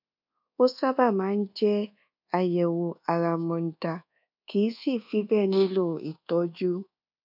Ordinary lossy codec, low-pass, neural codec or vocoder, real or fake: none; 5.4 kHz; codec, 24 kHz, 1.2 kbps, DualCodec; fake